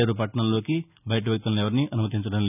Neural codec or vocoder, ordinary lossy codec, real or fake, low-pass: none; none; real; 3.6 kHz